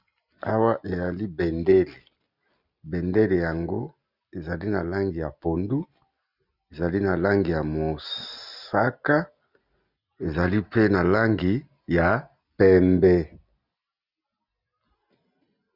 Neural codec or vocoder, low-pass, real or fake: none; 5.4 kHz; real